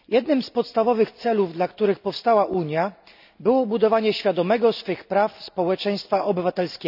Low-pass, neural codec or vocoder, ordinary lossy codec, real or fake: 5.4 kHz; none; none; real